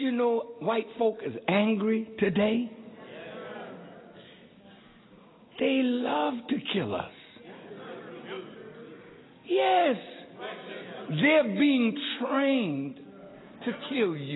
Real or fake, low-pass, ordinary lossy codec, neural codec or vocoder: real; 7.2 kHz; AAC, 16 kbps; none